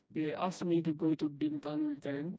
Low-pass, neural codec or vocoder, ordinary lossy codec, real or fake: none; codec, 16 kHz, 1 kbps, FreqCodec, smaller model; none; fake